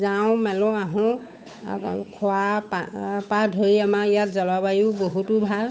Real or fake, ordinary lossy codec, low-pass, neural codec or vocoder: fake; none; none; codec, 16 kHz, 8 kbps, FunCodec, trained on Chinese and English, 25 frames a second